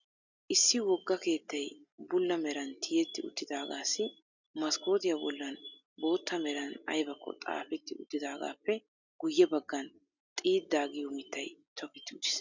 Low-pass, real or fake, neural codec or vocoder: 7.2 kHz; real; none